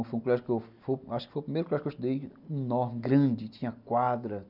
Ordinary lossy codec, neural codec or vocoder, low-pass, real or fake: none; none; 5.4 kHz; real